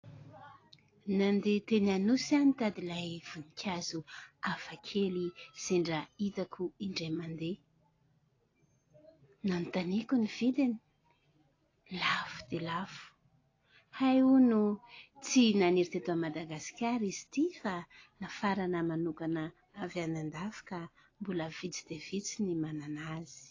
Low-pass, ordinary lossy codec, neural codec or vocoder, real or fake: 7.2 kHz; AAC, 32 kbps; none; real